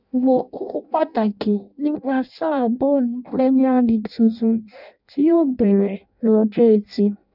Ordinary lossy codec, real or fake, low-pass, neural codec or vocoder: none; fake; 5.4 kHz; codec, 16 kHz in and 24 kHz out, 0.6 kbps, FireRedTTS-2 codec